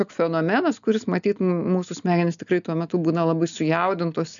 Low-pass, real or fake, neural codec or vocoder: 7.2 kHz; real; none